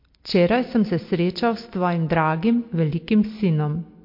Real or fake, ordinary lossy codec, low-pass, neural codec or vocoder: real; MP3, 32 kbps; 5.4 kHz; none